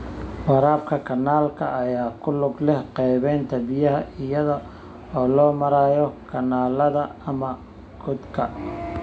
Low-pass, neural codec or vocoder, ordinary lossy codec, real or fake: none; none; none; real